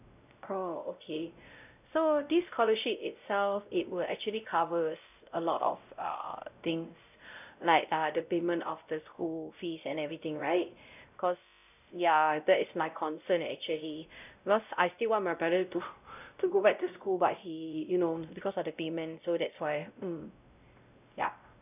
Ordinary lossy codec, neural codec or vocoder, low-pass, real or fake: none; codec, 16 kHz, 0.5 kbps, X-Codec, WavLM features, trained on Multilingual LibriSpeech; 3.6 kHz; fake